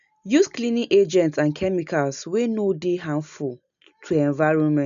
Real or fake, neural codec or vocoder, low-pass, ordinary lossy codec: real; none; 7.2 kHz; none